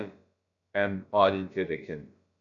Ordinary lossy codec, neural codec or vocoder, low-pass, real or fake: AAC, 64 kbps; codec, 16 kHz, about 1 kbps, DyCAST, with the encoder's durations; 7.2 kHz; fake